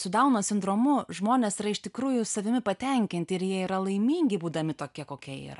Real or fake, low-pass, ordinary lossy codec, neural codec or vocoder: real; 10.8 kHz; MP3, 96 kbps; none